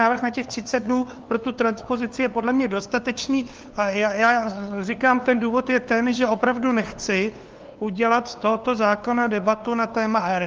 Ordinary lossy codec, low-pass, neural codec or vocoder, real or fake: Opus, 32 kbps; 7.2 kHz; codec, 16 kHz, 2 kbps, FunCodec, trained on LibriTTS, 25 frames a second; fake